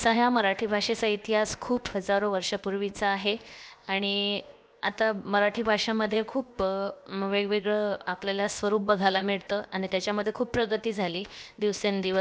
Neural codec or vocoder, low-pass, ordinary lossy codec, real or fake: codec, 16 kHz, 0.7 kbps, FocalCodec; none; none; fake